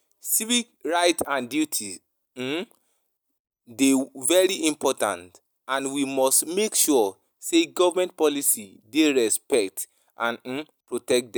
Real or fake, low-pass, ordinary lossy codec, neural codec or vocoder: real; none; none; none